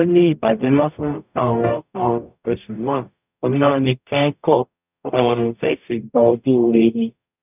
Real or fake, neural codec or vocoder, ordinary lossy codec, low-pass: fake; codec, 44.1 kHz, 0.9 kbps, DAC; none; 3.6 kHz